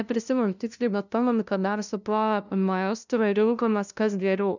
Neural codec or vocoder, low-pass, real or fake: codec, 16 kHz, 0.5 kbps, FunCodec, trained on LibriTTS, 25 frames a second; 7.2 kHz; fake